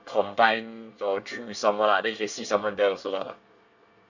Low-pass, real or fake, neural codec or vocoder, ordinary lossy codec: 7.2 kHz; fake; codec, 24 kHz, 1 kbps, SNAC; none